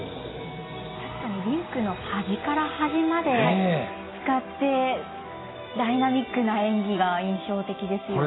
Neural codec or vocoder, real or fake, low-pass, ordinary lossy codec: none; real; 7.2 kHz; AAC, 16 kbps